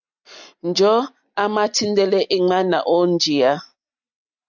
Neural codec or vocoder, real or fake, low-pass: none; real; 7.2 kHz